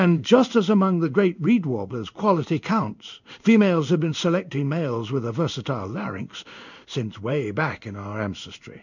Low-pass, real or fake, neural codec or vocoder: 7.2 kHz; fake; codec, 16 kHz in and 24 kHz out, 1 kbps, XY-Tokenizer